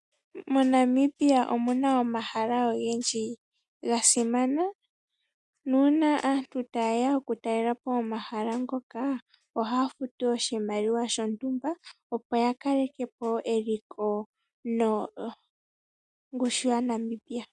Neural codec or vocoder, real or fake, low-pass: none; real; 10.8 kHz